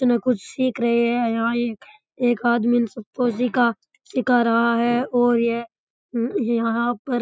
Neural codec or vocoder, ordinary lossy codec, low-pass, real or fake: none; none; none; real